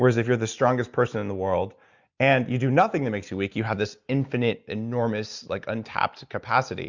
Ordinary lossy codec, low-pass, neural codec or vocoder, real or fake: Opus, 64 kbps; 7.2 kHz; none; real